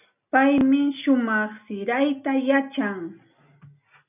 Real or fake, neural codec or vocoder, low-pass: real; none; 3.6 kHz